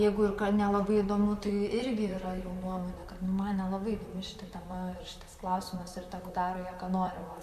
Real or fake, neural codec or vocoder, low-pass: fake; vocoder, 44.1 kHz, 128 mel bands, Pupu-Vocoder; 14.4 kHz